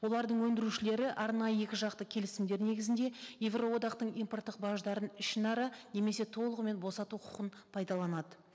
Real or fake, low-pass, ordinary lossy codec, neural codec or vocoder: real; none; none; none